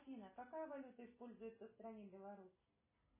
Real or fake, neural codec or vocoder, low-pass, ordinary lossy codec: real; none; 3.6 kHz; MP3, 16 kbps